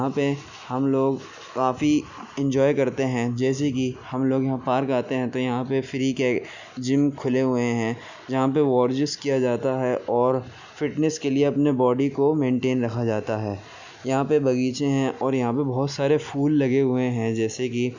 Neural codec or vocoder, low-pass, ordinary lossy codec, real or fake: none; 7.2 kHz; none; real